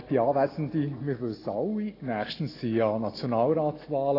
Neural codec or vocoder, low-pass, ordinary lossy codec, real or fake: none; 5.4 kHz; AAC, 24 kbps; real